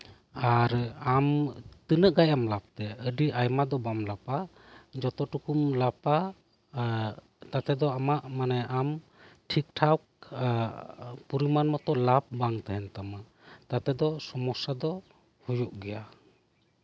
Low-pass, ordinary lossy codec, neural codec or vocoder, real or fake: none; none; none; real